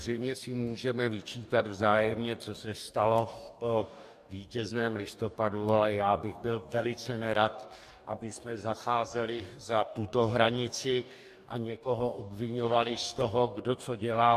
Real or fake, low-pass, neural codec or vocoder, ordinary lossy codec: fake; 14.4 kHz; codec, 44.1 kHz, 2.6 kbps, DAC; AAC, 96 kbps